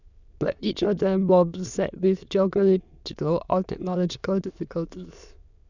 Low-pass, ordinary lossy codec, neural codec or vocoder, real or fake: 7.2 kHz; none; autoencoder, 22.05 kHz, a latent of 192 numbers a frame, VITS, trained on many speakers; fake